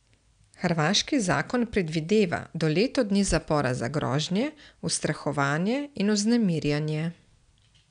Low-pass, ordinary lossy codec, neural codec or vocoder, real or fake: 9.9 kHz; none; none; real